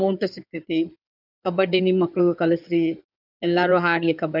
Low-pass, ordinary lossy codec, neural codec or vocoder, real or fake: 5.4 kHz; none; codec, 16 kHz in and 24 kHz out, 2.2 kbps, FireRedTTS-2 codec; fake